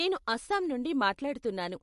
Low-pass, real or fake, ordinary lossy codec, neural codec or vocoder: 14.4 kHz; real; MP3, 48 kbps; none